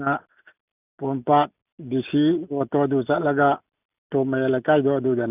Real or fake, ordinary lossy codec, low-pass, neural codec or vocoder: real; none; 3.6 kHz; none